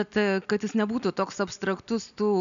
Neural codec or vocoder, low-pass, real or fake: none; 7.2 kHz; real